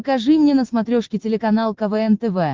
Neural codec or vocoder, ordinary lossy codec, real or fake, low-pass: none; Opus, 16 kbps; real; 7.2 kHz